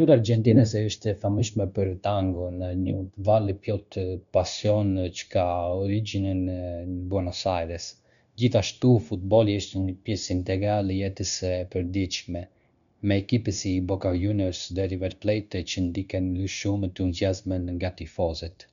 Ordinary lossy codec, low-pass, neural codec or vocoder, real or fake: none; 7.2 kHz; codec, 16 kHz, 0.9 kbps, LongCat-Audio-Codec; fake